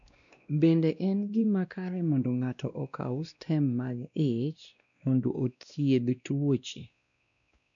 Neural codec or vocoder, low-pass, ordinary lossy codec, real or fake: codec, 16 kHz, 1 kbps, X-Codec, WavLM features, trained on Multilingual LibriSpeech; 7.2 kHz; none; fake